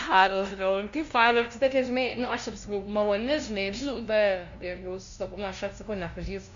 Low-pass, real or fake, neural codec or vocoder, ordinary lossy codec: 7.2 kHz; fake; codec, 16 kHz, 0.5 kbps, FunCodec, trained on LibriTTS, 25 frames a second; MP3, 64 kbps